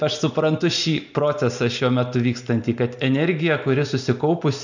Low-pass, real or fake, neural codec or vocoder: 7.2 kHz; real; none